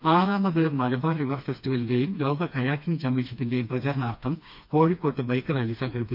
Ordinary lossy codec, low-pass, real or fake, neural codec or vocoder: none; 5.4 kHz; fake; codec, 16 kHz, 2 kbps, FreqCodec, smaller model